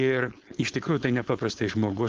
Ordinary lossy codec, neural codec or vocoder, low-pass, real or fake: Opus, 16 kbps; codec, 16 kHz, 4.8 kbps, FACodec; 7.2 kHz; fake